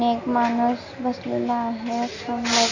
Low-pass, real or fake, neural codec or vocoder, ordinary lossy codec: 7.2 kHz; real; none; none